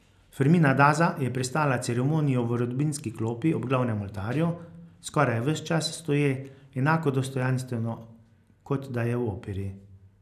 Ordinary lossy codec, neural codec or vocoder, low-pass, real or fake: none; none; 14.4 kHz; real